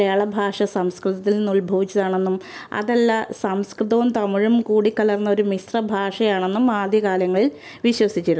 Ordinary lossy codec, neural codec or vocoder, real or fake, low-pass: none; none; real; none